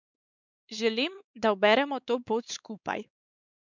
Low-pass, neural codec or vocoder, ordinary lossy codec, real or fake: 7.2 kHz; codec, 16 kHz, 4 kbps, X-Codec, WavLM features, trained on Multilingual LibriSpeech; none; fake